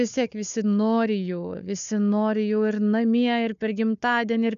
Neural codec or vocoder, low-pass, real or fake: codec, 16 kHz, 4 kbps, FunCodec, trained on Chinese and English, 50 frames a second; 7.2 kHz; fake